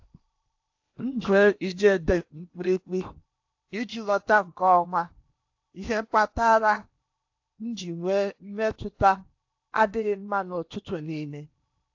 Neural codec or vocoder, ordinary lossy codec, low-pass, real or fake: codec, 16 kHz in and 24 kHz out, 0.8 kbps, FocalCodec, streaming, 65536 codes; AAC, 48 kbps; 7.2 kHz; fake